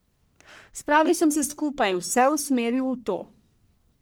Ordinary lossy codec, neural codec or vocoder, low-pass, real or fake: none; codec, 44.1 kHz, 1.7 kbps, Pupu-Codec; none; fake